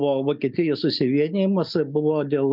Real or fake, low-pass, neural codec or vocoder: real; 5.4 kHz; none